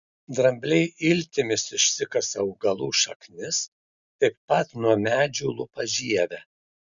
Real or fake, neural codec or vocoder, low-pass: real; none; 7.2 kHz